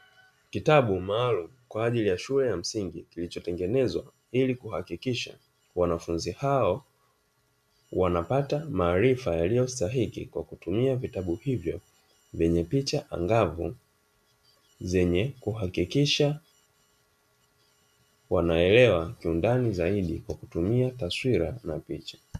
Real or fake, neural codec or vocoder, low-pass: real; none; 14.4 kHz